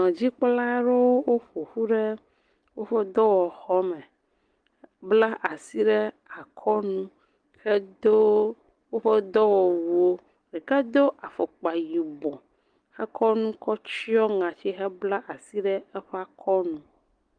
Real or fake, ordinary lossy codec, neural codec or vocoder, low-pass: real; Opus, 24 kbps; none; 9.9 kHz